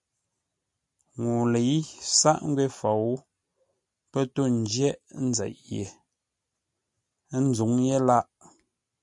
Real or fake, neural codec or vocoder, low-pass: real; none; 10.8 kHz